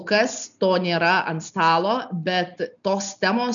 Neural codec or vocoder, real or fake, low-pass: none; real; 7.2 kHz